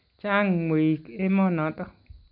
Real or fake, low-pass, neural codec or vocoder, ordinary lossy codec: real; 5.4 kHz; none; none